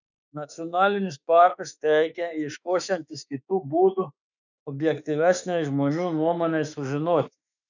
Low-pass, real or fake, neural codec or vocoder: 7.2 kHz; fake; autoencoder, 48 kHz, 32 numbers a frame, DAC-VAE, trained on Japanese speech